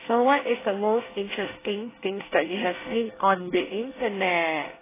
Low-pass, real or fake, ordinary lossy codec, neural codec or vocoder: 3.6 kHz; fake; AAC, 16 kbps; codec, 24 kHz, 1 kbps, SNAC